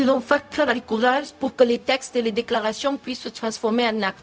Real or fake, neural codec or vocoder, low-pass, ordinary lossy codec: fake; codec, 16 kHz, 0.4 kbps, LongCat-Audio-Codec; none; none